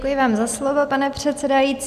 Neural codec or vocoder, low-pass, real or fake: none; 14.4 kHz; real